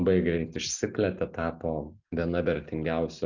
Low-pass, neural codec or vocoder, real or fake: 7.2 kHz; none; real